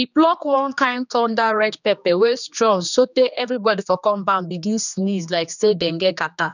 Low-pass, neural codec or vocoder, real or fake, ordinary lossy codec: 7.2 kHz; codec, 16 kHz, 2 kbps, X-Codec, HuBERT features, trained on general audio; fake; none